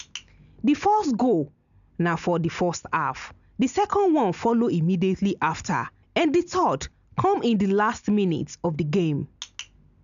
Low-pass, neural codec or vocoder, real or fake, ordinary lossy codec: 7.2 kHz; none; real; none